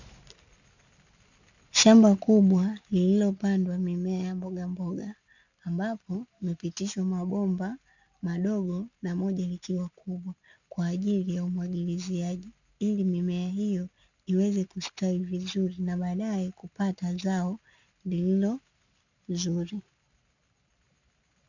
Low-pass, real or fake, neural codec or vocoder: 7.2 kHz; real; none